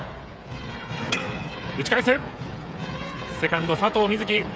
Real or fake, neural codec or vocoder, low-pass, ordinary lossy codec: fake; codec, 16 kHz, 8 kbps, FreqCodec, smaller model; none; none